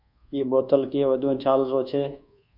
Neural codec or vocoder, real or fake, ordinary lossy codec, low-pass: codec, 24 kHz, 1.2 kbps, DualCodec; fake; MP3, 48 kbps; 5.4 kHz